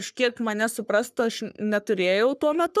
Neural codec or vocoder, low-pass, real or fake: codec, 44.1 kHz, 3.4 kbps, Pupu-Codec; 14.4 kHz; fake